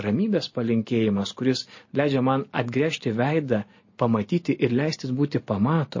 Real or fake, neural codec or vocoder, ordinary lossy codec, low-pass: real; none; MP3, 32 kbps; 7.2 kHz